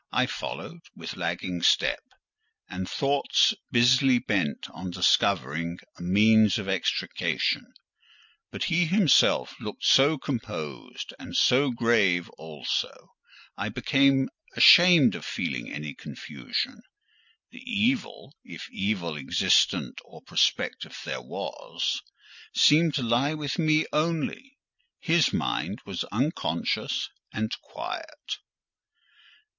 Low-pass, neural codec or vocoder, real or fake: 7.2 kHz; none; real